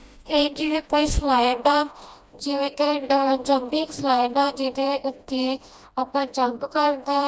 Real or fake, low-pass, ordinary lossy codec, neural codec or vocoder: fake; none; none; codec, 16 kHz, 1 kbps, FreqCodec, smaller model